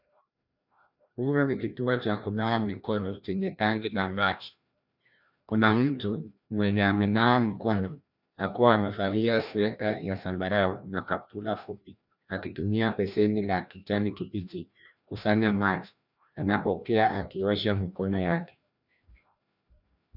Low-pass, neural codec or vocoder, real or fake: 5.4 kHz; codec, 16 kHz, 1 kbps, FreqCodec, larger model; fake